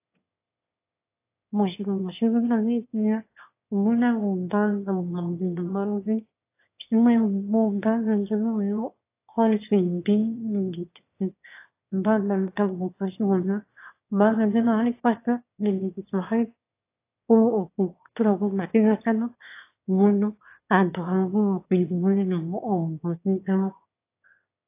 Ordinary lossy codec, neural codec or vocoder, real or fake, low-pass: AAC, 24 kbps; autoencoder, 22.05 kHz, a latent of 192 numbers a frame, VITS, trained on one speaker; fake; 3.6 kHz